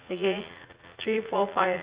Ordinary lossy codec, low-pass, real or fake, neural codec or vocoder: Opus, 64 kbps; 3.6 kHz; fake; vocoder, 44.1 kHz, 80 mel bands, Vocos